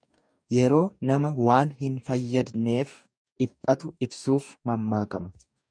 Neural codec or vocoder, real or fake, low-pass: codec, 44.1 kHz, 2.6 kbps, DAC; fake; 9.9 kHz